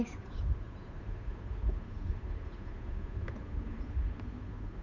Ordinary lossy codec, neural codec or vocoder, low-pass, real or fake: Opus, 64 kbps; codec, 16 kHz, 8 kbps, FunCodec, trained on Chinese and English, 25 frames a second; 7.2 kHz; fake